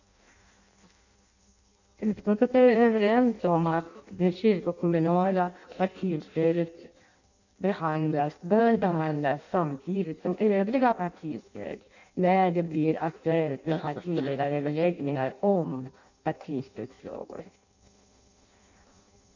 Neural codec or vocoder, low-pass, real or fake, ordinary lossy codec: codec, 16 kHz in and 24 kHz out, 0.6 kbps, FireRedTTS-2 codec; 7.2 kHz; fake; none